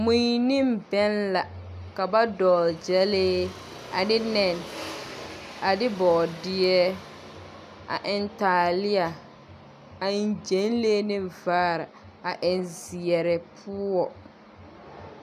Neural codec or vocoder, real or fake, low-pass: none; real; 14.4 kHz